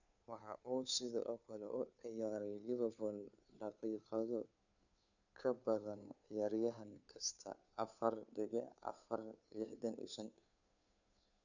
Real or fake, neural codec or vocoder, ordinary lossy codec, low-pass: fake; codec, 16 kHz, 2 kbps, FunCodec, trained on Chinese and English, 25 frames a second; none; 7.2 kHz